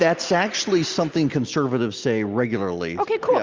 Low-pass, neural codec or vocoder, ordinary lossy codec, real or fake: 7.2 kHz; none; Opus, 32 kbps; real